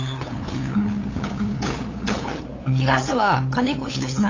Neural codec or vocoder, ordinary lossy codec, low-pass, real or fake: codec, 16 kHz, 8 kbps, FunCodec, trained on LibriTTS, 25 frames a second; AAC, 32 kbps; 7.2 kHz; fake